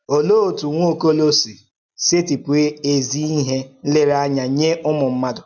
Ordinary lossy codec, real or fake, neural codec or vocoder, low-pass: none; real; none; 7.2 kHz